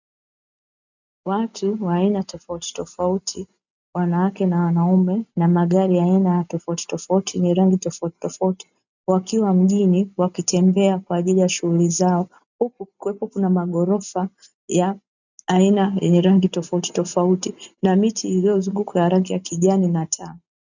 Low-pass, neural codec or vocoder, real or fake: 7.2 kHz; none; real